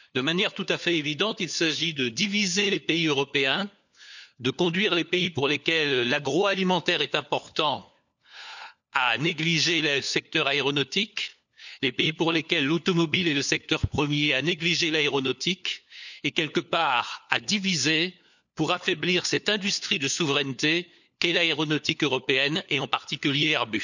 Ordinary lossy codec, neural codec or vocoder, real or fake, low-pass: none; codec, 16 kHz, 4 kbps, FunCodec, trained on LibriTTS, 50 frames a second; fake; 7.2 kHz